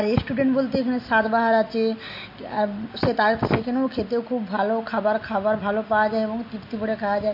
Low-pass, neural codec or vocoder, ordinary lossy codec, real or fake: 5.4 kHz; none; MP3, 32 kbps; real